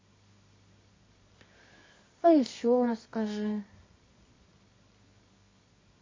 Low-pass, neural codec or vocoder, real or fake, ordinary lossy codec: 7.2 kHz; codec, 24 kHz, 0.9 kbps, WavTokenizer, medium music audio release; fake; MP3, 32 kbps